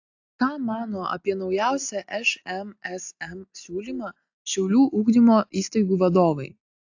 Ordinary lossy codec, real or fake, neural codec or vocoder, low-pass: AAC, 48 kbps; real; none; 7.2 kHz